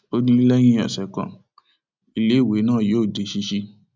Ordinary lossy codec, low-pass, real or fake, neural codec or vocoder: none; none; real; none